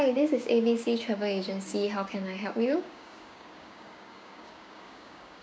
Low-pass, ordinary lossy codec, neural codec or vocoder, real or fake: none; none; codec, 16 kHz, 6 kbps, DAC; fake